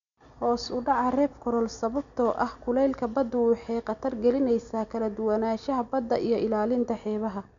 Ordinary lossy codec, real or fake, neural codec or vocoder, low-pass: none; real; none; 7.2 kHz